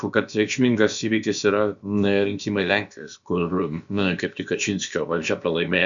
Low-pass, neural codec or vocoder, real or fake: 7.2 kHz; codec, 16 kHz, about 1 kbps, DyCAST, with the encoder's durations; fake